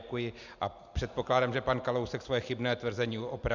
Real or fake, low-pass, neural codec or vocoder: real; 7.2 kHz; none